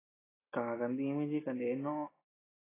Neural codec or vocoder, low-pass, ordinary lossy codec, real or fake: none; 3.6 kHz; AAC, 16 kbps; real